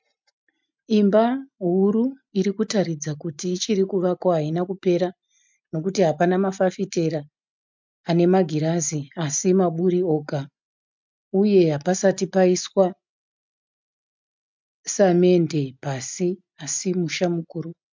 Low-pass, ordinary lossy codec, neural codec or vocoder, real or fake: 7.2 kHz; MP3, 64 kbps; none; real